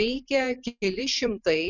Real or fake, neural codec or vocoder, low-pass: fake; vocoder, 44.1 kHz, 128 mel bands every 512 samples, BigVGAN v2; 7.2 kHz